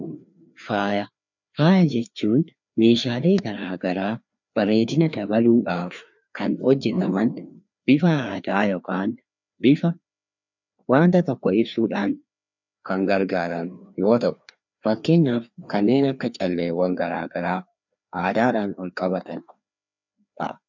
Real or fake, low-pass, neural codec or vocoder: fake; 7.2 kHz; codec, 16 kHz, 2 kbps, FreqCodec, larger model